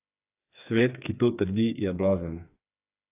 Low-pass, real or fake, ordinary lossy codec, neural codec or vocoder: 3.6 kHz; fake; AAC, 32 kbps; codec, 16 kHz, 4 kbps, FreqCodec, smaller model